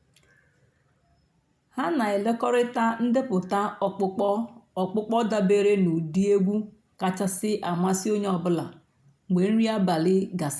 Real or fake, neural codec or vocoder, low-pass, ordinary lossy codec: real; none; none; none